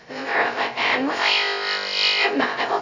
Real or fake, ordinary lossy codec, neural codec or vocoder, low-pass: fake; none; codec, 16 kHz, 0.2 kbps, FocalCodec; 7.2 kHz